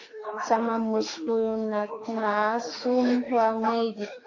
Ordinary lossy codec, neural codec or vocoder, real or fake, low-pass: AAC, 48 kbps; autoencoder, 48 kHz, 32 numbers a frame, DAC-VAE, trained on Japanese speech; fake; 7.2 kHz